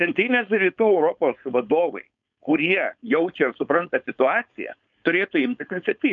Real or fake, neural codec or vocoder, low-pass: fake; codec, 16 kHz, 4.8 kbps, FACodec; 7.2 kHz